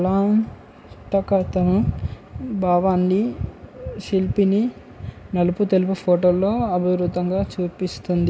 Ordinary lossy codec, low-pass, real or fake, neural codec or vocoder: none; none; real; none